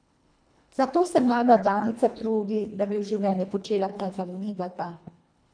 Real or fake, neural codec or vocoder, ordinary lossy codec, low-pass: fake; codec, 24 kHz, 1.5 kbps, HILCodec; none; 9.9 kHz